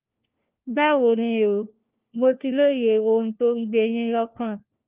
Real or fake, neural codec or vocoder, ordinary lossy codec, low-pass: fake; codec, 16 kHz, 1 kbps, FunCodec, trained on LibriTTS, 50 frames a second; Opus, 32 kbps; 3.6 kHz